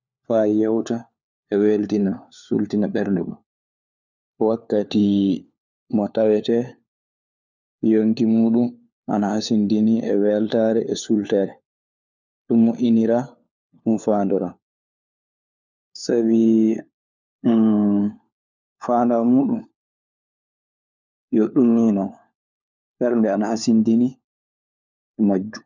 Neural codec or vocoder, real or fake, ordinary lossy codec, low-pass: codec, 16 kHz, 4 kbps, FunCodec, trained on LibriTTS, 50 frames a second; fake; none; 7.2 kHz